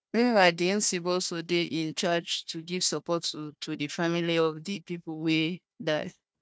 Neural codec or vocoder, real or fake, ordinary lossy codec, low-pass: codec, 16 kHz, 1 kbps, FunCodec, trained on Chinese and English, 50 frames a second; fake; none; none